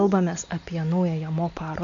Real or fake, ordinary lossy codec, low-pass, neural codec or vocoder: real; MP3, 64 kbps; 7.2 kHz; none